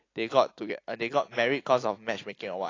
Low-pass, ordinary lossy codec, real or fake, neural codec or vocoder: 7.2 kHz; AAC, 32 kbps; real; none